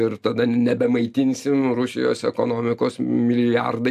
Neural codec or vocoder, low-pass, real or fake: none; 14.4 kHz; real